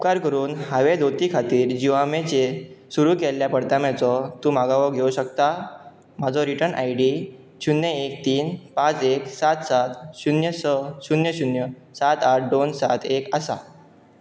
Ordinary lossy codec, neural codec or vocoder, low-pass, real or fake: none; none; none; real